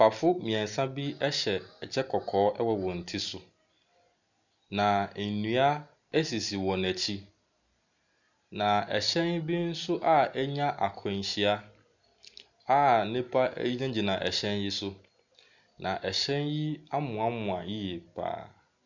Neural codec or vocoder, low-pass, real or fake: none; 7.2 kHz; real